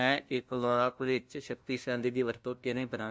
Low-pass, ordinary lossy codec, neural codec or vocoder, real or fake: none; none; codec, 16 kHz, 0.5 kbps, FunCodec, trained on LibriTTS, 25 frames a second; fake